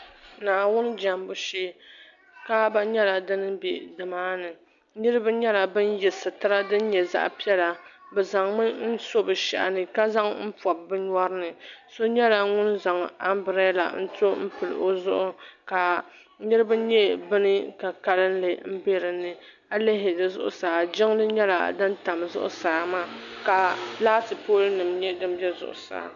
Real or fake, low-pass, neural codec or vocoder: real; 7.2 kHz; none